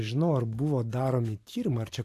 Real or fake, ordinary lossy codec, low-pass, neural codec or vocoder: fake; AAC, 64 kbps; 14.4 kHz; vocoder, 44.1 kHz, 128 mel bands every 512 samples, BigVGAN v2